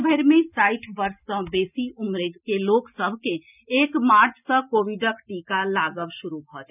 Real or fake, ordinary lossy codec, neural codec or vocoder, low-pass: real; none; none; 3.6 kHz